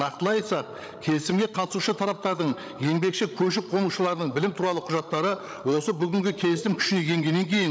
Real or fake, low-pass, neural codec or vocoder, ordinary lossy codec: fake; none; codec, 16 kHz, 16 kbps, FreqCodec, larger model; none